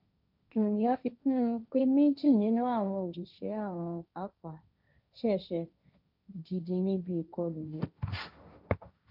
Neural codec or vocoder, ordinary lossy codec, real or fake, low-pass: codec, 16 kHz, 1.1 kbps, Voila-Tokenizer; none; fake; 5.4 kHz